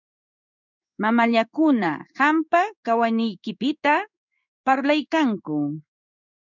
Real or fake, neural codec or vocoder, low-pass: fake; codec, 16 kHz in and 24 kHz out, 1 kbps, XY-Tokenizer; 7.2 kHz